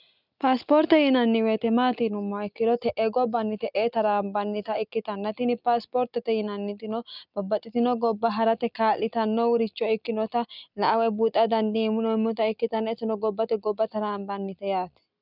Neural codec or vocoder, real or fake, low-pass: none; real; 5.4 kHz